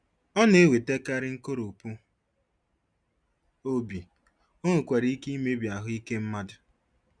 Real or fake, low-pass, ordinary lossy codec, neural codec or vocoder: real; 9.9 kHz; none; none